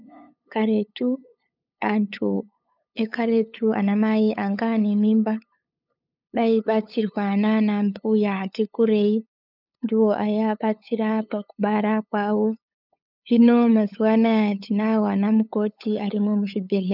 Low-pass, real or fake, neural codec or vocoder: 5.4 kHz; fake; codec, 16 kHz, 8 kbps, FunCodec, trained on LibriTTS, 25 frames a second